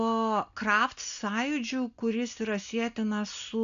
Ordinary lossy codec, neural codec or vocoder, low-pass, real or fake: AAC, 96 kbps; none; 7.2 kHz; real